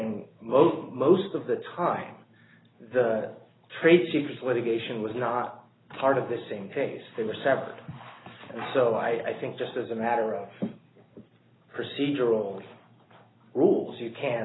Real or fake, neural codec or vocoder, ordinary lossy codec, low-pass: real; none; AAC, 16 kbps; 7.2 kHz